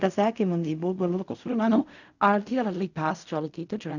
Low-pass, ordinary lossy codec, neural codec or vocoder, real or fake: 7.2 kHz; none; codec, 16 kHz in and 24 kHz out, 0.4 kbps, LongCat-Audio-Codec, fine tuned four codebook decoder; fake